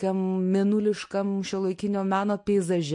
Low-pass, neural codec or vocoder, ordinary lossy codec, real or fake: 10.8 kHz; none; MP3, 48 kbps; real